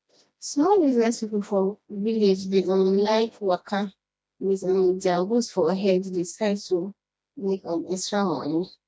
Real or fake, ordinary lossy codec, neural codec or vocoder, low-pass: fake; none; codec, 16 kHz, 1 kbps, FreqCodec, smaller model; none